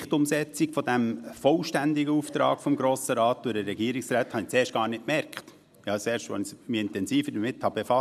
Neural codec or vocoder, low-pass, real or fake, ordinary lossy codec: none; 14.4 kHz; real; none